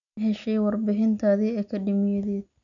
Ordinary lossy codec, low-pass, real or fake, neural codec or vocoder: none; 7.2 kHz; real; none